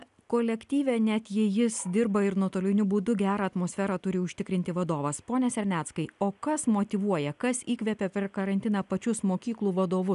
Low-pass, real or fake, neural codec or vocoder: 10.8 kHz; real; none